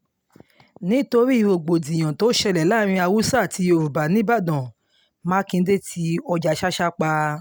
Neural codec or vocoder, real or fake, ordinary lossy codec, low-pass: none; real; none; none